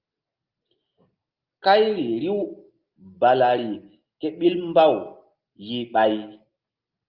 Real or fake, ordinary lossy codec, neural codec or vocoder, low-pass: real; Opus, 32 kbps; none; 5.4 kHz